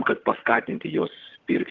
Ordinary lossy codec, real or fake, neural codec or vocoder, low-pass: Opus, 16 kbps; fake; vocoder, 22.05 kHz, 80 mel bands, HiFi-GAN; 7.2 kHz